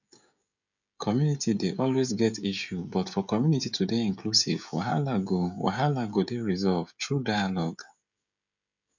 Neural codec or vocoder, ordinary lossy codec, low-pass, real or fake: codec, 16 kHz, 16 kbps, FreqCodec, smaller model; none; 7.2 kHz; fake